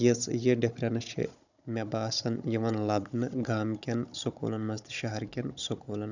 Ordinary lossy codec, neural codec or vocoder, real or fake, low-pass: none; codec, 16 kHz, 16 kbps, FunCodec, trained on Chinese and English, 50 frames a second; fake; 7.2 kHz